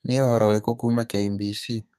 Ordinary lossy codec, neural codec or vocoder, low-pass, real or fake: none; codec, 32 kHz, 1.9 kbps, SNAC; 14.4 kHz; fake